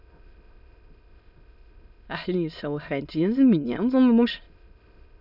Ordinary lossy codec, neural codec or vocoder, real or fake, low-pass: none; autoencoder, 22.05 kHz, a latent of 192 numbers a frame, VITS, trained on many speakers; fake; 5.4 kHz